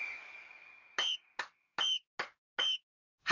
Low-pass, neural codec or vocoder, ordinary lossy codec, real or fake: 7.2 kHz; codec, 44.1 kHz, 7.8 kbps, DAC; none; fake